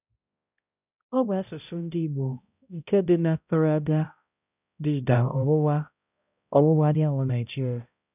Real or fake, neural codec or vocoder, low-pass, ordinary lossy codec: fake; codec, 16 kHz, 0.5 kbps, X-Codec, HuBERT features, trained on balanced general audio; 3.6 kHz; none